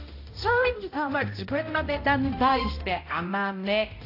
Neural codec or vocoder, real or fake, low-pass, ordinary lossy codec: codec, 16 kHz, 0.5 kbps, X-Codec, HuBERT features, trained on balanced general audio; fake; 5.4 kHz; AAC, 32 kbps